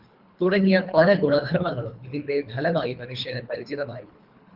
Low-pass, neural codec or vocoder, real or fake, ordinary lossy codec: 5.4 kHz; codec, 24 kHz, 3 kbps, HILCodec; fake; Opus, 32 kbps